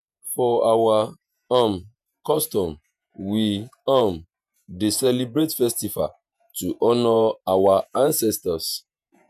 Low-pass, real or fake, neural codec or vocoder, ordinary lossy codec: 14.4 kHz; fake; vocoder, 48 kHz, 128 mel bands, Vocos; none